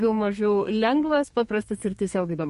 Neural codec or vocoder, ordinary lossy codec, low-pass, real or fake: codec, 32 kHz, 1.9 kbps, SNAC; MP3, 48 kbps; 14.4 kHz; fake